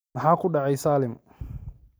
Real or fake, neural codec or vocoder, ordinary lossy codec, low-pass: real; none; none; none